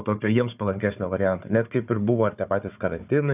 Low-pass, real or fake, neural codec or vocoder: 3.6 kHz; fake; codec, 16 kHz, 4 kbps, FunCodec, trained on Chinese and English, 50 frames a second